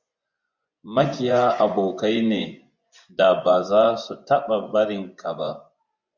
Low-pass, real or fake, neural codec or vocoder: 7.2 kHz; fake; vocoder, 24 kHz, 100 mel bands, Vocos